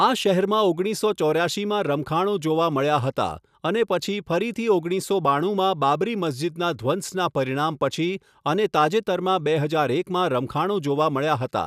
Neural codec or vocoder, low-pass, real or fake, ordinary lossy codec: none; 14.4 kHz; real; none